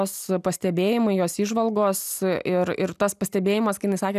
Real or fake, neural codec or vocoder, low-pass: real; none; 14.4 kHz